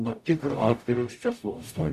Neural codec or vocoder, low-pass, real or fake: codec, 44.1 kHz, 0.9 kbps, DAC; 14.4 kHz; fake